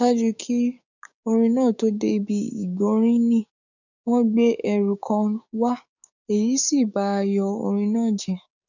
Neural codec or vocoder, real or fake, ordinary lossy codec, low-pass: codec, 16 kHz, 6 kbps, DAC; fake; none; 7.2 kHz